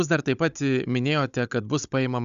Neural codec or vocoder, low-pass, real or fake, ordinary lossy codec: codec, 16 kHz, 16 kbps, FunCodec, trained on Chinese and English, 50 frames a second; 7.2 kHz; fake; Opus, 64 kbps